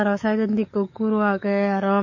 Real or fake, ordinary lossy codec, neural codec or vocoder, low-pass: fake; MP3, 32 kbps; codec, 44.1 kHz, 7.8 kbps, DAC; 7.2 kHz